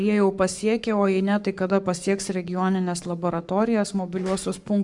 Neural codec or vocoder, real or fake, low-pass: codec, 44.1 kHz, 7.8 kbps, Pupu-Codec; fake; 10.8 kHz